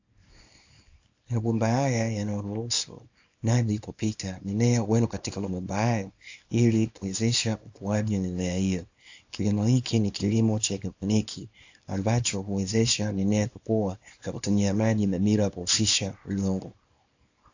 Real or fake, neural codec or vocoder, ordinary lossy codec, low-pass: fake; codec, 24 kHz, 0.9 kbps, WavTokenizer, small release; AAC, 48 kbps; 7.2 kHz